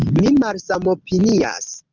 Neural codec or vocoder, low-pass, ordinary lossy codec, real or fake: none; 7.2 kHz; Opus, 32 kbps; real